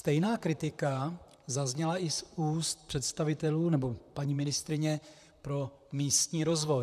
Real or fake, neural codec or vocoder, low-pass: fake; vocoder, 44.1 kHz, 128 mel bands, Pupu-Vocoder; 14.4 kHz